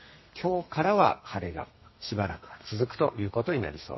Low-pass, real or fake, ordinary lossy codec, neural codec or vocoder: 7.2 kHz; fake; MP3, 24 kbps; codec, 32 kHz, 1.9 kbps, SNAC